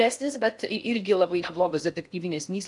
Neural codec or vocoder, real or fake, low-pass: codec, 16 kHz in and 24 kHz out, 0.6 kbps, FocalCodec, streaming, 4096 codes; fake; 10.8 kHz